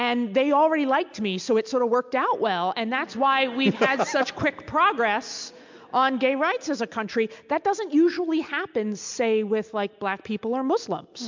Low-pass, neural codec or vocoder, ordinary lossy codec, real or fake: 7.2 kHz; none; MP3, 64 kbps; real